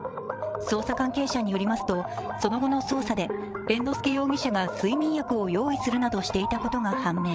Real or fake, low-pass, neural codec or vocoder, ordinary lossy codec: fake; none; codec, 16 kHz, 8 kbps, FreqCodec, larger model; none